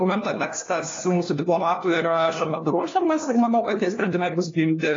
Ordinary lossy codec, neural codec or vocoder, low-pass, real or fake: AAC, 32 kbps; codec, 16 kHz, 1 kbps, FunCodec, trained on LibriTTS, 50 frames a second; 7.2 kHz; fake